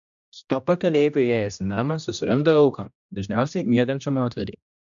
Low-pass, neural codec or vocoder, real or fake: 7.2 kHz; codec, 16 kHz, 1 kbps, X-Codec, HuBERT features, trained on general audio; fake